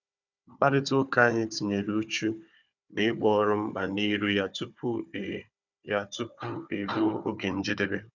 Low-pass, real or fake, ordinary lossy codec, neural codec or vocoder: 7.2 kHz; fake; none; codec, 16 kHz, 4 kbps, FunCodec, trained on Chinese and English, 50 frames a second